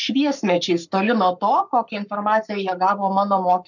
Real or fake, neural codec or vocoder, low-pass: fake; codec, 44.1 kHz, 7.8 kbps, Pupu-Codec; 7.2 kHz